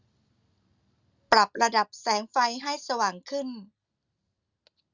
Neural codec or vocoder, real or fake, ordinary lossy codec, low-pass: none; real; Opus, 64 kbps; 7.2 kHz